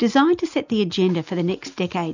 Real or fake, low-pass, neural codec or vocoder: real; 7.2 kHz; none